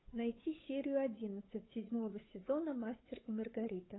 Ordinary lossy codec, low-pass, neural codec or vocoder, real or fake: AAC, 16 kbps; 7.2 kHz; codec, 16 kHz, 8 kbps, FunCodec, trained on Chinese and English, 25 frames a second; fake